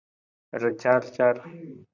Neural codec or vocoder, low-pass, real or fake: vocoder, 44.1 kHz, 128 mel bands, Pupu-Vocoder; 7.2 kHz; fake